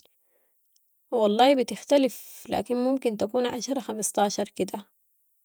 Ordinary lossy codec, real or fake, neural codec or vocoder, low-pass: none; fake; vocoder, 48 kHz, 128 mel bands, Vocos; none